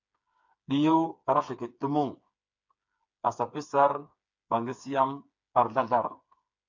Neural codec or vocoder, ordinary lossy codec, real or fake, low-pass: codec, 16 kHz, 4 kbps, FreqCodec, smaller model; MP3, 64 kbps; fake; 7.2 kHz